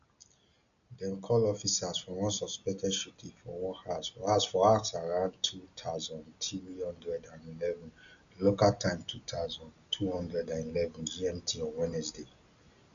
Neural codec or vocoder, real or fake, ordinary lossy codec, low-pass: none; real; none; 7.2 kHz